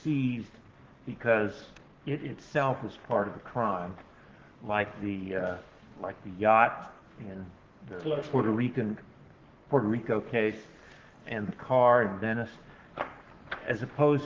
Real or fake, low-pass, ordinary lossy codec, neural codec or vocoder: fake; 7.2 kHz; Opus, 16 kbps; codec, 44.1 kHz, 7.8 kbps, Pupu-Codec